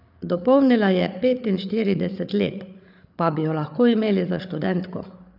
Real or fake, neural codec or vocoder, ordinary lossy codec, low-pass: fake; codec, 16 kHz, 8 kbps, FreqCodec, larger model; none; 5.4 kHz